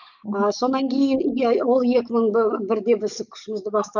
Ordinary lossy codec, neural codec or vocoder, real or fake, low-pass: none; codec, 44.1 kHz, 7.8 kbps, DAC; fake; 7.2 kHz